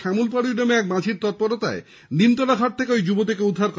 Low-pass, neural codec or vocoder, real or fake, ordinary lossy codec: none; none; real; none